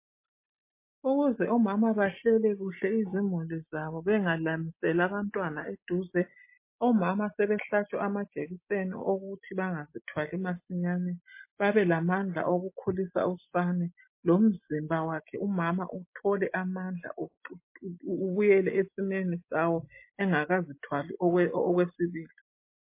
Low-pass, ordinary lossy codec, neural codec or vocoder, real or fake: 3.6 kHz; MP3, 24 kbps; none; real